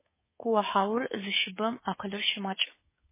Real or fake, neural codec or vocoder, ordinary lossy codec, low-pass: fake; codec, 24 kHz, 3.1 kbps, DualCodec; MP3, 16 kbps; 3.6 kHz